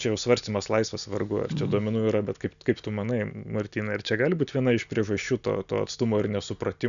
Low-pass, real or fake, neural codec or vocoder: 7.2 kHz; real; none